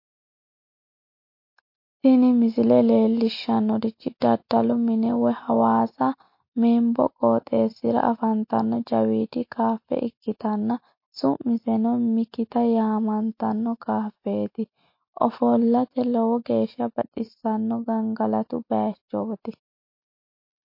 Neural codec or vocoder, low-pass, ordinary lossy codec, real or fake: none; 5.4 kHz; MP3, 32 kbps; real